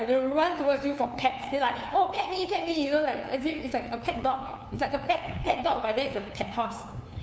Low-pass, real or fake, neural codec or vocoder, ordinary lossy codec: none; fake; codec, 16 kHz, 2 kbps, FunCodec, trained on LibriTTS, 25 frames a second; none